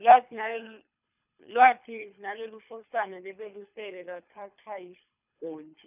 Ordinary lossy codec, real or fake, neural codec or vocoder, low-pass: none; fake; codec, 24 kHz, 3 kbps, HILCodec; 3.6 kHz